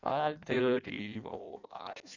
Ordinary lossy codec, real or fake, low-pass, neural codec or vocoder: none; fake; 7.2 kHz; codec, 16 kHz in and 24 kHz out, 0.6 kbps, FireRedTTS-2 codec